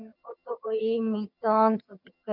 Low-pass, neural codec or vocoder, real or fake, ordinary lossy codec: 5.4 kHz; vocoder, 22.05 kHz, 80 mel bands, HiFi-GAN; fake; none